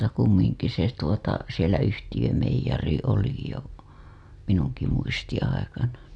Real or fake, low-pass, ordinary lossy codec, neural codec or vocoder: real; none; none; none